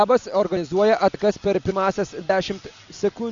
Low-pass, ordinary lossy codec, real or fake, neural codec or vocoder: 7.2 kHz; Opus, 64 kbps; real; none